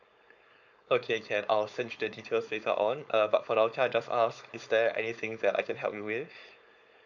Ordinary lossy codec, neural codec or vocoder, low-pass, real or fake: none; codec, 16 kHz, 4.8 kbps, FACodec; 7.2 kHz; fake